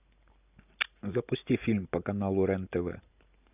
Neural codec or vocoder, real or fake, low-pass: none; real; 3.6 kHz